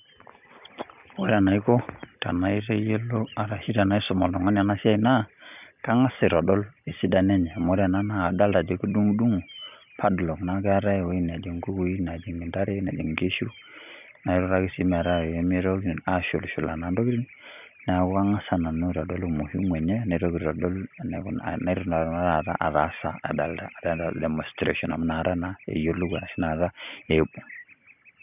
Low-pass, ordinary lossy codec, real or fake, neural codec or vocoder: 3.6 kHz; none; real; none